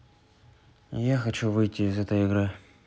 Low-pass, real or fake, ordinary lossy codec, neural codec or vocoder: none; real; none; none